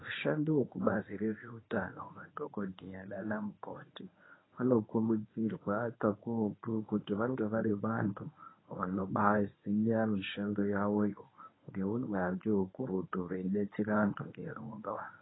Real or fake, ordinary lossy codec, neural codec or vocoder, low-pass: fake; AAC, 16 kbps; codec, 24 kHz, 0.9 kbps, WavTokenizer, medium speech release version 1; 7.2 kHz